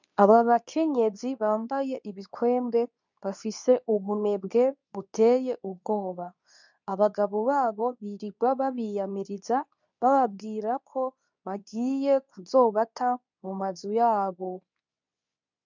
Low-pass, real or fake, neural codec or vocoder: 7.2 kHz; fake; codec, 24 kHz, 0.9 kbps, WavTokenizer, medium speech release version 2